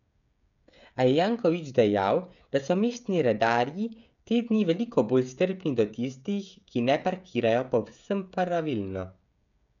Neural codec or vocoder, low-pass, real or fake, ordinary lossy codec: codec, 16 kHz, 16 kbps, FreqCodec, smaller model; 7.2 kHz; fake; none